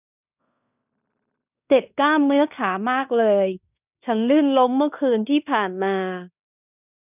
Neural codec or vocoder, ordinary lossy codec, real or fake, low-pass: codec, 16 kHz in and 24 kHz out, 0.9 kbps, LongCat-Audio-Codec, fine tuned four codebook decoder; none; fake; 3.6 kHz